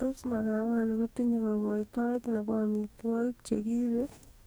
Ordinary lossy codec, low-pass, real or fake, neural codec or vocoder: none; none; fake; codec, 44.1 kHz, 2.6 kbps, DAC